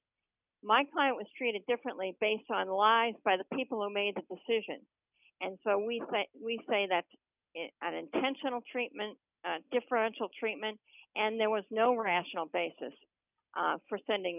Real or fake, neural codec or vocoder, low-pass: real; none; 3.6 kHz